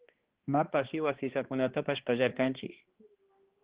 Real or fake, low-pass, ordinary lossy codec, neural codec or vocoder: fake; 3.6 kHz; Opus, 16 kbps; codec, 16 kHz, 2 kbps, X-Codec, HuBERT features, trained on balanced general audio